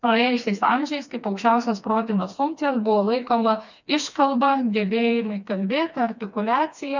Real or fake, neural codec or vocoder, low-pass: fake; codec, 16 kHz, 2 kbps, FreqCodec, smaller model; 7.2 kHz